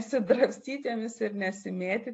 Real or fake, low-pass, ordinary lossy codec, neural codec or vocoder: real; 9.9 kHz; AAC, 48 kbps; none